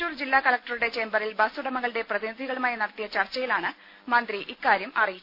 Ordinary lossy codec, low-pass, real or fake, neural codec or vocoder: none; 5.4 kHz; real; none